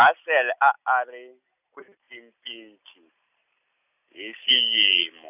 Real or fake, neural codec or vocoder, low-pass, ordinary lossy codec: real; none; 3.6 kHz; none